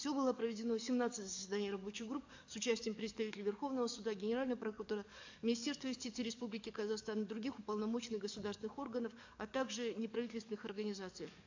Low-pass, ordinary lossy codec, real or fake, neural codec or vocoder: 7.2 kHz; AAC, 48 kbps; fake; codec, 44.1 kHz, 7.8 kbps, DAC